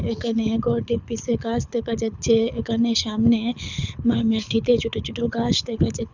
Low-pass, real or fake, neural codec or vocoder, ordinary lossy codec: 7.2 kHz; fake; codec, 24 kHz, 6 kbps, HILCodec; none